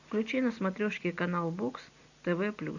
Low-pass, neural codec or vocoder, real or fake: 7.2 kHz; none; real